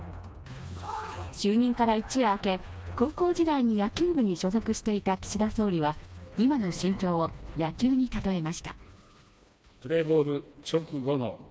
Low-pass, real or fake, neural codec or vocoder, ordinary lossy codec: none; fake; codec, 16 kHz, 2 kbps, FreqCodec, smaller model; none